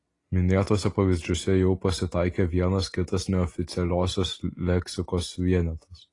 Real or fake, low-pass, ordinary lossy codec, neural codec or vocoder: real; 10.8 kHz; AAC, 32 kbps; none